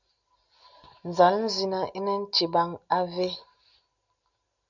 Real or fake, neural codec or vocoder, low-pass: real; none; 7.2 kHz